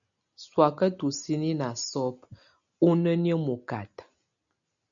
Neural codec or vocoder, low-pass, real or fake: none; 7.2 kHz; real